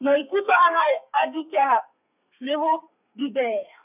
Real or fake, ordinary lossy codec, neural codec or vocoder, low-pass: fake; none; codec, 32 kHz, 1.9 kbps, SNAC; 3.6 kHz